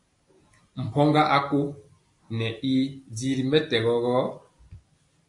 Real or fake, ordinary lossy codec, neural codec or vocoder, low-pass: real; AAC, 48 kbps; none; 10.8 kHz